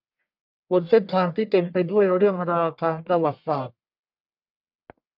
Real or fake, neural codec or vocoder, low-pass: fake; codec, 44.1 kHz, 1.7 kbps, Pupu-Codec; 5.4 kHz